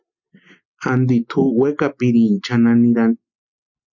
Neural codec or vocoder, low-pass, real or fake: none; 7.2 kHz; real